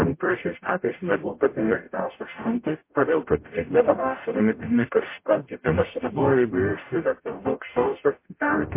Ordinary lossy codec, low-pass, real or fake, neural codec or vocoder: MP3, 24 kbps; 3.6 kHz; fake; codec, 44.1 kHz, 0.9 kbps, DAC